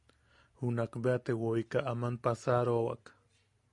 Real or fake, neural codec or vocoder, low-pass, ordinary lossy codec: real; none; 10.8 kHz; MP3, 64 kbps